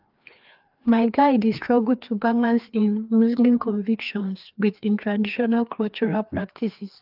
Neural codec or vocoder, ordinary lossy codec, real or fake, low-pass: codec, 16 kHz, 2 kbps, FreqCodec, larger model; Opus, 32 kbps; fake; 5.4 kHz